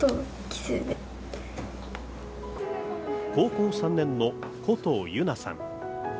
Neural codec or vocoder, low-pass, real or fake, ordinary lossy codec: none; none; real; none